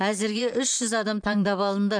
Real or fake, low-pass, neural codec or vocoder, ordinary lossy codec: fake; 9.9 kHz; vocoder, 44.1 kHz, 128 mel bands, Pupu-Vocoder; none